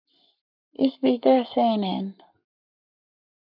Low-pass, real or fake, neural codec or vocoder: 5.4 kHz; fake; codec, 44.1 kHz, 7.8 kbps, Pupu-Codec